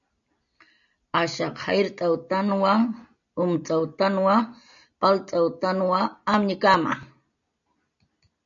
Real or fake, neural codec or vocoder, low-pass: real; none; 7.2 kHz